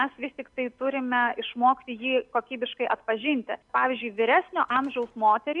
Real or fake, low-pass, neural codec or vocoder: real; 10.8 kHz; none